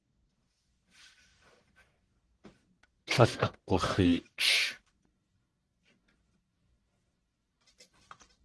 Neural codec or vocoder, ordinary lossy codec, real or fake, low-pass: codec, 44.1 kHz, 1.7 kbps, Pupu-Codec; Opus, 16 kbps; fake; 10.8 kHz